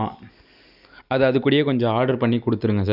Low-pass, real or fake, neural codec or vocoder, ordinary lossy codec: 5.4 kHz; real; none; none